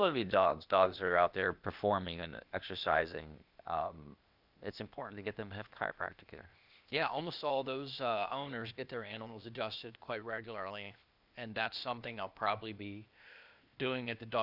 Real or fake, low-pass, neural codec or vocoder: fake; 5.4 kHz; codec, 16 kHz, 0.8 kbps, ZipCodec